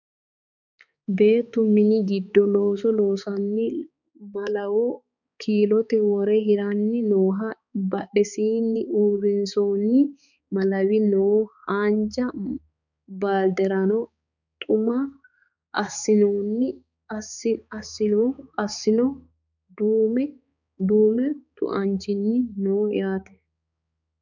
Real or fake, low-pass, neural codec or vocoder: fake; 7.2 kHz; codec, 16 kHz, 4 kbps, X-Codec, HuBERT features, trained on balanced general audio